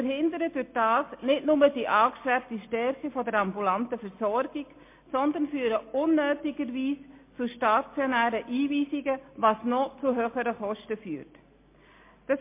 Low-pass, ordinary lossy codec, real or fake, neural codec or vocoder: 3.6 kHz; MP3, 24 kbps; real; none